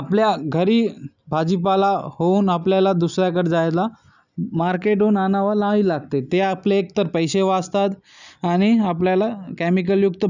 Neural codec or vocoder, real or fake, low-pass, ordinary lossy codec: none; real; 7.2 kHz; none